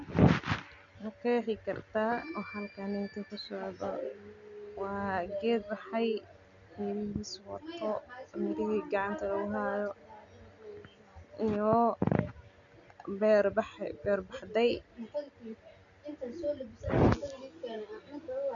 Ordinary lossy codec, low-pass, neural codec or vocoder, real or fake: none; 7.2 kHz; none; real